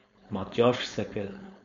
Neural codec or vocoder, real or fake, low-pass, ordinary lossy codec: codec, 16 kHz, 4.8 kbps, FACodec; fake; 7.2 kHz; MP3, 48 kbps